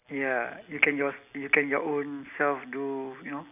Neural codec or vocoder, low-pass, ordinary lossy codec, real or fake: none; 3.6 kHz; none; real